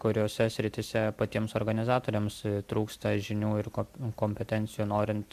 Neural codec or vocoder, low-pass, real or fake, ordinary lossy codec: none; 14.4 kHz; real; AAC, 96 kbps